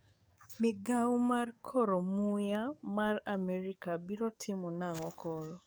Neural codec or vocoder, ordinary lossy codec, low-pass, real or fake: codec, 44.1 kHz, 7.8 kbps, DAC; none; none; fake